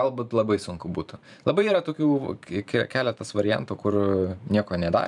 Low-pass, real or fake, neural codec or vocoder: 10.8 kHz; fake; vocoder, 44.1 kHz, 128 mel bands every 512 samples, BigVGAN v2